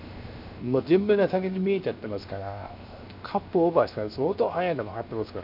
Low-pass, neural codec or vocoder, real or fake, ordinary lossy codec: 5.4 kHz; codec, 16 kHz, 0.7 kbps, FocalCodec; fake; none